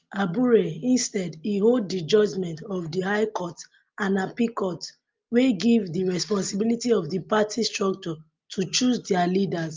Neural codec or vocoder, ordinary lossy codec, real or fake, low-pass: none; Opus, 24 kbps; real; 7.2 kHz